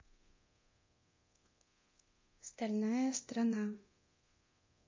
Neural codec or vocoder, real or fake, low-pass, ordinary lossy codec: codec, 24 kHz, 0.9 kbps, DualCodec; fake; 7.2 kHz; MP3, 32 kbps